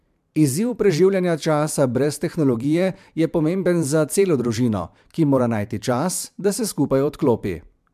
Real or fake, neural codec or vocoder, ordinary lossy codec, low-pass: fake; vocoder, 44.1 kHz, 128 mel bands every 512 samples, BigVGAN v2; MP3, 96 kbps; 14.4 kHz